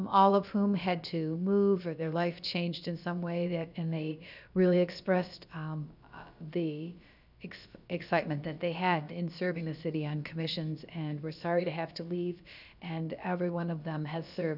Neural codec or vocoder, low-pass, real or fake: codec, 16 kHz, about 1 kbps, DyCAST, with the encoder's durations; 5.4 kHz; fake